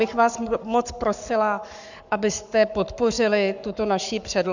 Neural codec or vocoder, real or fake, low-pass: codec, 44.1 kHz, 7.8 kbps, Pupu-Codec; fake; 7.2 kHz